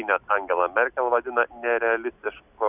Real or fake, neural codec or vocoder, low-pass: real; none; 3.6 kHz